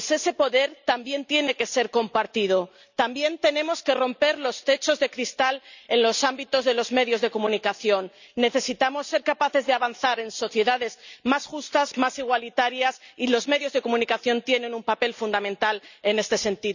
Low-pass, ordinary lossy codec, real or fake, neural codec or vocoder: 7.2 kHz; none; real; none